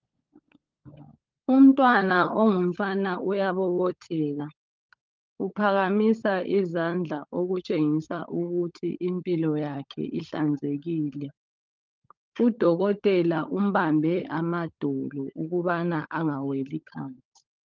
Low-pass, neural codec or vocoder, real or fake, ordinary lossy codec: 7.2 kHz; codec, 16 kHz, 16 kbps, FunCodec, trained on LibriTTS, 50 frames a second; fake; Opus, 32 kbps